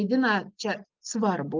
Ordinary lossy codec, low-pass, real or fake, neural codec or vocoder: Opus, 24 kbps; 7.2 kHz; real; none